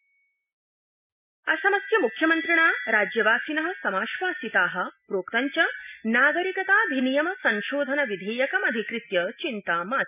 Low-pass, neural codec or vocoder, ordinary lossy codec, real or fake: 3.6 kHz; none; none; real